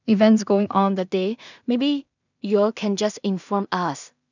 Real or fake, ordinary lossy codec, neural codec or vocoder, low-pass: fake; none; codec, 16 kHz in and 24 kHz out, 0.4 kbps, LongCat-Audio-Codec, two codebook decoder; 7.2 kHz